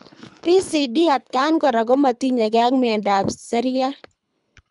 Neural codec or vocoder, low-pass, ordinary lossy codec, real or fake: codec, 24 kHz, 3 kbps, HILCodec; 10.8 kHz; none; fake